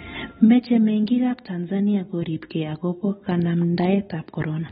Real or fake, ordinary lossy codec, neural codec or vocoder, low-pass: real; AAC, 16 kbps; none; 19.8 kHz